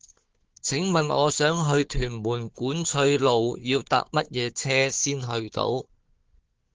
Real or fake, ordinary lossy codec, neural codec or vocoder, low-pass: fake; Opus, 16 kbps; codec, 16 kHz, 4 kbps, FunCodec, trained on Chinese and English, 50 frames a second; 7.2 kHz